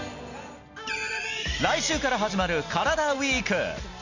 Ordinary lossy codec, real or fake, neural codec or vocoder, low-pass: none; real; none; 7.2 kHz